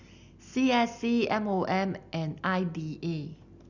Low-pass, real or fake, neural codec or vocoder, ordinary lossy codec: 7.2 kHz; real; none; none